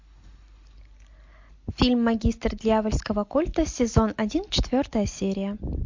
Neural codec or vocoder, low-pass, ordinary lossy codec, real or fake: none; 7.2 kHz; MP3, 48 kbps; real